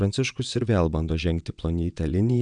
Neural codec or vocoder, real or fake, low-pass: vocoder, 22.05 kHz, 80 mel bands, WaveNeXt; fake; 9.9 kHz